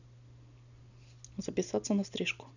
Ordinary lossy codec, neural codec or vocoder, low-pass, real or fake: none; none; 7.2 kHz; real